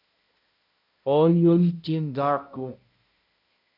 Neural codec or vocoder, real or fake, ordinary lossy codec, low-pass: codec, 16 kHz, 0.5 kbps, X-Codec, HuBERT features, trained on balanced general audio; fake; AAC, 32 kbps; 5.4 kHz